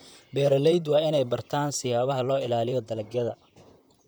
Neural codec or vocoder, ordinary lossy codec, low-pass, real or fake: vocoder, 44.1 kHz, 128 mel bands, Pupu-Vocoder; none; none; fake